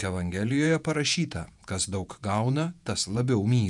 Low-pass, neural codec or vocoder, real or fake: 10.8 kHz; vocoder, 48 kHz, 128 mel bands, Vocos; fake